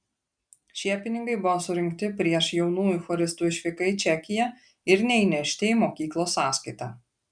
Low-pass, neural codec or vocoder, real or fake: 9.9 kHz; none; real